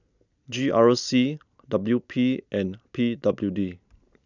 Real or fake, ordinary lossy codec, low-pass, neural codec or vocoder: real; none; 7.2 kHz; none